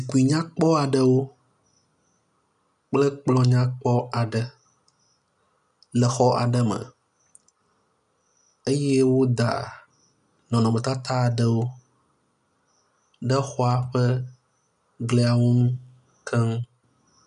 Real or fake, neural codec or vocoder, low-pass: real; none; 10.8 kHz